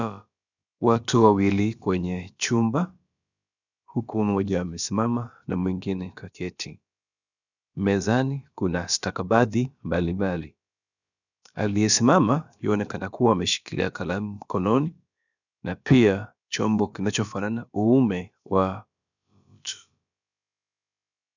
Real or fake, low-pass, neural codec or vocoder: fake; 7.2 kHz; codec, 16 kHz, about 1 kbps, DyCAST, with the encoder's durations